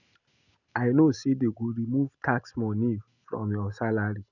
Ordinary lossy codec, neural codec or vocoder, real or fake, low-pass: none; none; real; 7.2 kHz